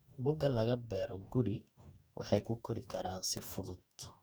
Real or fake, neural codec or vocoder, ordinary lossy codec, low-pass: fake; codec, 44.1 kHz, 2.6 kbps, DAC; none; none